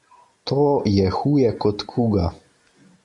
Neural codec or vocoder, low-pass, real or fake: none; 10.8 kHz; real